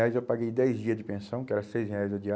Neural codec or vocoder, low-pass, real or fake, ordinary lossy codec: none; none; real; none